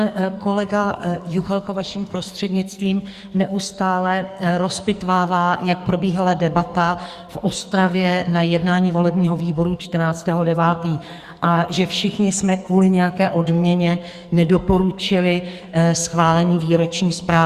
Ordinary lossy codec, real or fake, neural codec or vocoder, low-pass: Opus, 64 kbps; fake; codec, 44.1 kHz, 2.6 kbps, SNAC; 14.4 kHz